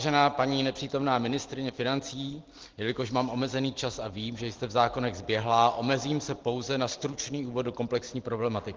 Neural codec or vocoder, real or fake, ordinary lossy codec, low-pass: none; real; Opus, 16 kbps; 7.2 kHz